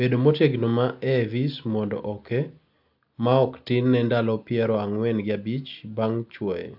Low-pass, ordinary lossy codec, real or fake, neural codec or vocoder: 5.4 kHz; AAC, 48 kbps; real; none